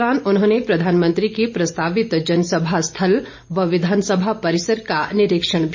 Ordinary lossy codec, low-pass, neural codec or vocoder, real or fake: MP3, 48 kbps; 7.2 kHz; none; real